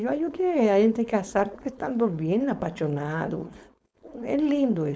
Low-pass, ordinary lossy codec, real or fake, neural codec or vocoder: none; none; fake; codec, 16 kHz, 4.8 kbps, FACodec